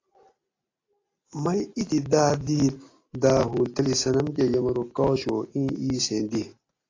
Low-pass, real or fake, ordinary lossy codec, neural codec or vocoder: 7.2 kHz; real; AAC, 32 kbps; none